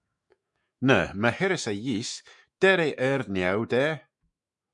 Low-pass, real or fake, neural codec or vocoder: 10.8 kHz; fake; autoencoder, 48 kHz, 128 numbers a frame, DAC-VAE, trained on Japanese speech